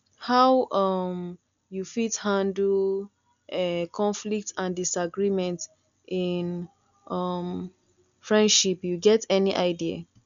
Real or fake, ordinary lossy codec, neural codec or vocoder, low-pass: real; none; none; 7.2 kHz